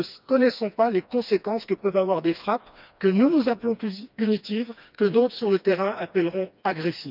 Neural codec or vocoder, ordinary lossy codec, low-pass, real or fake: codec, 16 kHz, 2 kbps, FreqCodec, smaller model; none; 5.4 kHz; fake